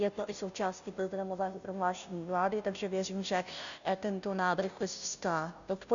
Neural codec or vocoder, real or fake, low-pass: codec, 16 kHz, 0.5 kbps, FunCodec, trained on Chinese and English, 25 frames a second; fake; 7.2 kHz